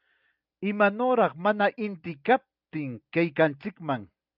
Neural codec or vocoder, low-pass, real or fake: none; 5.4 kHz; real